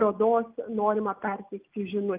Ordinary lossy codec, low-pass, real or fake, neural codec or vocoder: Opus, 24 kbps; 3.6 kHz; real; none